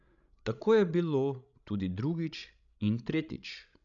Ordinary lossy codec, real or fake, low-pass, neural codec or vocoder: none; fake; 7.2 kHz; codec, 16 kHz, 16 kbps, FreqCodec, larger model